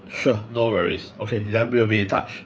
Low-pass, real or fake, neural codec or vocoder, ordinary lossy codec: none; fake; codec, 16 kHz, 4 kbps, FreqCodec, larger model; none